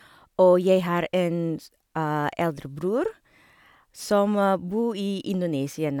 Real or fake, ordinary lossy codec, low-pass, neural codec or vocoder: real; none; 19.8 kHz; none